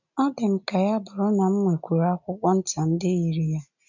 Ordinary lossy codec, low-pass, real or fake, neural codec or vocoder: none; 7.2 kHz; real; none